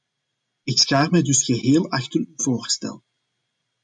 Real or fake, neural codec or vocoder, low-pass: real; none; 9.9 kHz